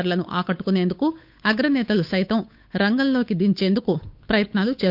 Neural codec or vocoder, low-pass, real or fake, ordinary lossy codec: codec, 16 kHz, 8 kbps, FunCodec, trained on Chinese and English, 25 frames a second; 5.4 kHz; fake; none